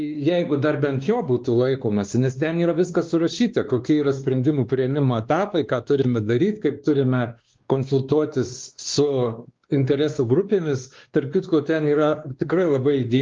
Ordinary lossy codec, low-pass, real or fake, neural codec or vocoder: Opus, 32 kbps; 7.2 kHz; fake; codec, 16 kHz, 2 kbps, X-Codec, WavLM features, trained on Multilingual LibriSpeech